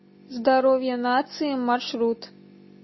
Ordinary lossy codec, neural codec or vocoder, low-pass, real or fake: MP3, 24 kbps; none; 7.2 kHz; real